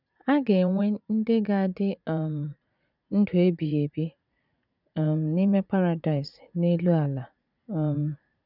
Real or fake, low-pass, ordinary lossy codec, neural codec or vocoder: fake; 5.4 kHz; none; vocoder, 44.1 kHz, 80 mel bands, Vocos